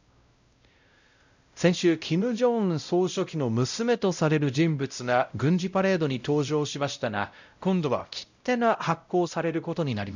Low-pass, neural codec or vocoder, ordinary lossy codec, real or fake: 7.2 kHz; codec, 16 kHz, 0.5 kbps, X-Codec, WavLM features, trained on Multilingual LibriSpeech; none; fake